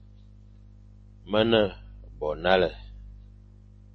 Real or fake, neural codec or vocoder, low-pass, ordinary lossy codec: real; none; 9.9 kHz; MP3, 32 kbps